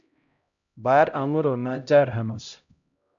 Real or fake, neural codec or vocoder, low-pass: fake; codec, 16 kHz, 0.5 kbps, X-Codec, HuBERT features, trained on LibriSpeech; 7.2 kHz